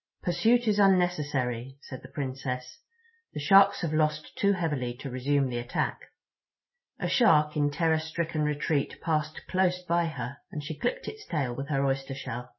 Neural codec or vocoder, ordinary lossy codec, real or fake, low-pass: none; MP3, 24 kbps; real; 7.2 kHz